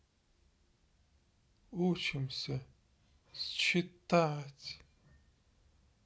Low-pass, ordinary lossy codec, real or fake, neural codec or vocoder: none; none; real; none